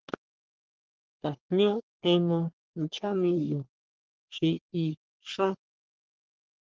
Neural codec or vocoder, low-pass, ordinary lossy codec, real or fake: codec, 44.1 kHz, 3.4 kbps, Pupu-Codec; 7.2 kHz; Opus, 16 kbps; fake